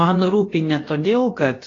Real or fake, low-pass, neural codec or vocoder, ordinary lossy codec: fake; 7.2 kHz; codec, 16 kHz, about 1 kbps, DyCAST, with the encoder's durations; AAC, 32 kbps